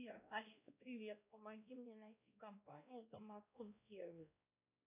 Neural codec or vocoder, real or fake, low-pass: codec, 16 kHz, 1 kbps, X-Codec, WavLM features, trained on Multilingual LibriSpeech; fake; 3.6 kHz